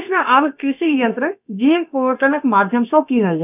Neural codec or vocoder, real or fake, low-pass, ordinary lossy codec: codec, 16 kHz, about 1 kbps, DyCAST, with the encoder's durations; fake; 3.6 kHz; none